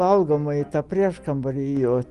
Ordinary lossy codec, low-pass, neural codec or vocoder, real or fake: Opus, 24 kbps; 10.8 kHz; none; real